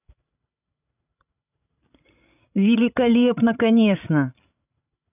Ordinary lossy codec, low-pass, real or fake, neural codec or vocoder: none; 3.6 kHz; fake; codec, 16 kHz, 16 kbps, FreqCodec, larger model